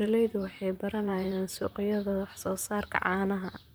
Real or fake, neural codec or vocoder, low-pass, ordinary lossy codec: fake; vocoder, 44.1 kHz, 128 mel bands, Pupu-Vocoder; none; none